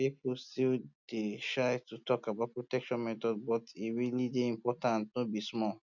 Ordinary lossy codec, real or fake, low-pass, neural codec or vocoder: none; real; 7.2 kHz; none